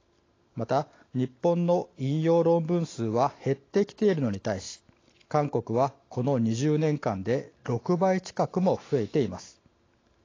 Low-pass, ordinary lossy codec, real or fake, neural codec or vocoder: 7.2 kHz; AAC, 32 kbps; real; none